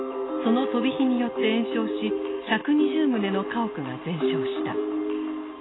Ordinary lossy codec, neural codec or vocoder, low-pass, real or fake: AAC, 16 kbps; none; 7.2 kHz; real